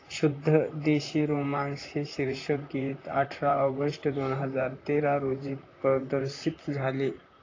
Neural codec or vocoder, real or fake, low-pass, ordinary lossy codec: vocoder, 44.1 kHz, 128 mel bands, Pupu-Vocoder; fake; 7.2 kHz; AAC, 32 kbps